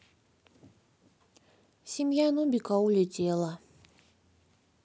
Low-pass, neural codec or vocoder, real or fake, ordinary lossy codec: none; none; real; none